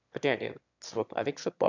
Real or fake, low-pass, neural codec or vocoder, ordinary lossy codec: fake; 7.2 kHz; autoencoder, 22.05 kHz, a latent of 192 numbers a frame, VITS, trained on one speaker; none